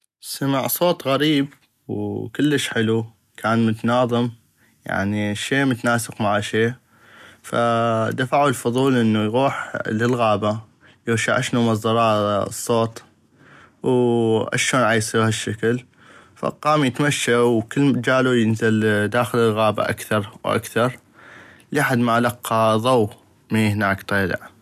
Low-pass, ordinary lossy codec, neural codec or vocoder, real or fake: 14.4 kHz; none; none; real